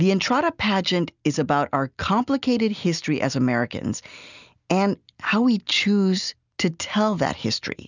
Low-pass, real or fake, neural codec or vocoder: 7.2 kHz; real; none